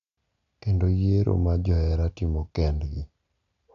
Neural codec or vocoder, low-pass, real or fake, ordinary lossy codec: none; 7.2 kHz; real; none